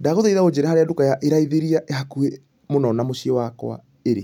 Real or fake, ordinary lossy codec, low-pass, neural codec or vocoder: real; none; 19.8 kHz; none